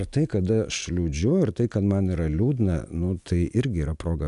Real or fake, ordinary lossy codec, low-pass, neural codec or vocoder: real; MP3, 96 kbps; 10.8 kHz; none